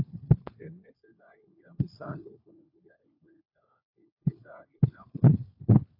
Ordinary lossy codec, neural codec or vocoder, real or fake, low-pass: AAC, 32 kbps; codec, 16 kHz, 4 kbps, FunCodec, trained on LibriTTS, 50 frames a second; fake; 5.4 kHz